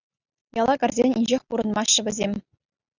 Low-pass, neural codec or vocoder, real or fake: 7.2 kHz; none; real